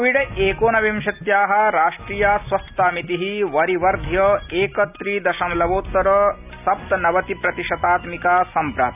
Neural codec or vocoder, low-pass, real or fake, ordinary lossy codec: none; 3.6 kHz; real; none